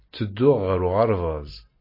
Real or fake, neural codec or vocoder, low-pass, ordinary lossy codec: real; none; 5.4 kHz; MP3, 24 kbps